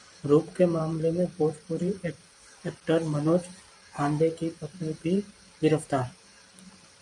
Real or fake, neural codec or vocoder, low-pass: fake; vocoder, 44.1 kHz, 128 mel bands every 512 samples, BigVGAN v2; 10.8 kHz